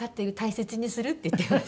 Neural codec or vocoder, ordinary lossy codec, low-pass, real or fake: none; none; none; real